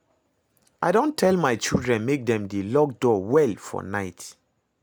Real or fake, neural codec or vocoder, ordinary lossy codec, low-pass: real; none; none; none